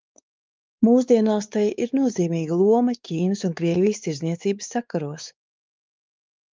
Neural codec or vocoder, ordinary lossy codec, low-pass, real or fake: codec, 16 kHz, 4 kbps, X-Codec, WavLM features, trained on Multilingual LibriSpeech; Opus, 24 kbps; 7.2 kHz; fake